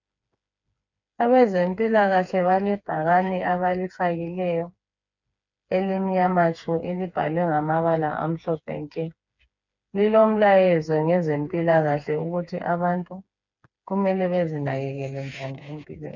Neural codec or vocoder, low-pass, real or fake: codec, 16 kHz, 4 kbps, FreqCodec, smaller model; 7.2 kHz; fake